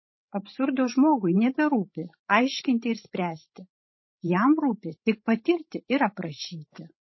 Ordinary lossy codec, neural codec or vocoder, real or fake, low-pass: MP3, 24 kbps; codec, 16 kHz, 16 kbps, FreqCodec, larger model; fake; 7.2 kHz